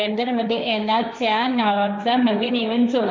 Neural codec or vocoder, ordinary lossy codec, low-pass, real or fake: codec, 16 kHz, 1.1 kbps, Voila-Tokenizer; none; none; fake